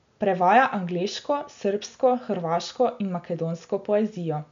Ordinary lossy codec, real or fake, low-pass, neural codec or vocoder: MP3, 64 kbps; real; 7.2 kHz; none